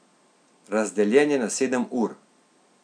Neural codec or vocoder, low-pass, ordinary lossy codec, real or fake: none; 9.9 kHz; none; real